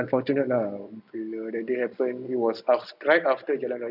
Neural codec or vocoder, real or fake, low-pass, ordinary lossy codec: none; real; 5.4 kHz; none